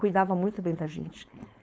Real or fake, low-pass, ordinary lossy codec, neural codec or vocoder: fake; none; none; codec, 16 kHz, 4.8 kbps, FACodec